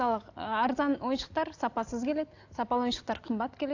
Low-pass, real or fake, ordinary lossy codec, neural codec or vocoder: 7.2 kHz; real; AAC, 48 kbps; none